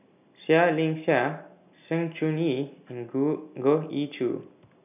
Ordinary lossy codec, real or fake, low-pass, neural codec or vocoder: none; real; 3.6 kHz; none